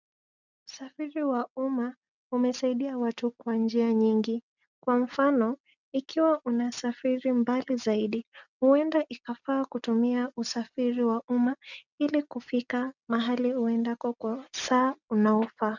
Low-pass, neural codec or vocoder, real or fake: 7.2 kHz; none; real